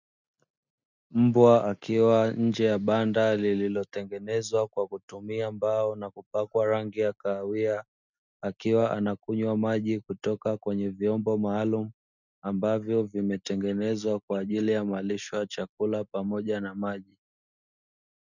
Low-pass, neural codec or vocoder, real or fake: 7.2 kHz; none; real